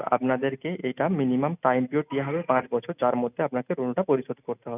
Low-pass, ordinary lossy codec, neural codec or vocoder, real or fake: 3.6 kHz; none; none; real